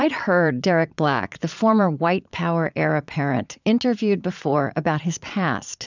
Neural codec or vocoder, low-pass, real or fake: vocoder, 22.05 kHz, 80 mel bands, Vocos; 7.2 kHz; fake